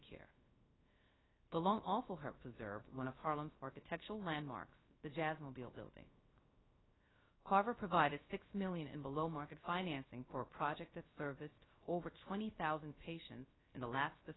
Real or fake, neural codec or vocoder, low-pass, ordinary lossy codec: fake; codec, 16 kHz, 0.3 kbps, FocalCodec; 7.2 kHz; AAC, 16 kbps